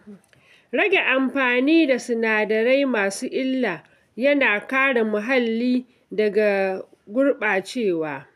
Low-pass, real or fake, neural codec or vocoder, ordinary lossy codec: 14.4 kHz; real; none; none